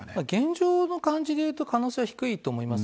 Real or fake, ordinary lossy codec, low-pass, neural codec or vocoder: real; none; none; none